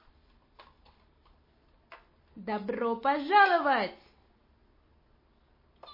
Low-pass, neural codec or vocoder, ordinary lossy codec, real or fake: 5.4 kHz; none; MP3, 24 kbps; real